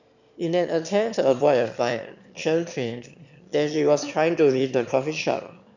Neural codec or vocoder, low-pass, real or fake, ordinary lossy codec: autoencoder, 22.05 kHz, a latent of 192 numbers a frame, VITS, trained on one speaker; 7.2 kHz; fake; none